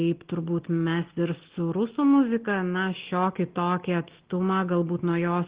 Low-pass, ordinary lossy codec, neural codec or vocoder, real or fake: 3.6 kHz; Opus, 16 kbps; none; real